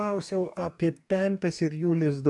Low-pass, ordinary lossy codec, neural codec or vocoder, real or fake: 10.8 kHz; AAC, 64 kbps; codec, 44.1 kHz, 2.6 kbps, DAC; fake